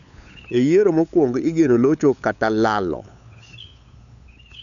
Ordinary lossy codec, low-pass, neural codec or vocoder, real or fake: none; 7.2 kHz; codec, 16 kHz, 8 kbps, FunCodec, trained on LibriTTS, 25 frames a second; fake